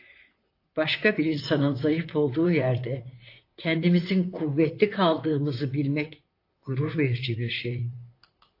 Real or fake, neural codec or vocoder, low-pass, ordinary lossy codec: fake; vocoder, 44.1 kHz, 128 mel bands, Pupu-Vocoder; 5.4 kHz; AAC, 32 kbps